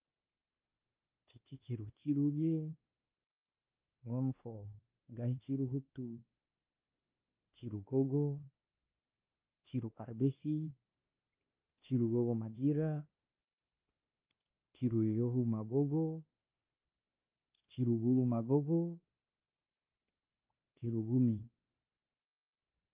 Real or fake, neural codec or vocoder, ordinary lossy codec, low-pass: fake; codec, 16 kHz, 2 kbps, X-Codec, WavLM features, trained on Multilingual LibriSpeech; Opus, 32 kbps; 3.6 kHz